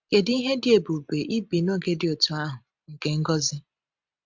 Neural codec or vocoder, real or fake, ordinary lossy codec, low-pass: none; real; MP3, 64 kbps; 7.2 kHz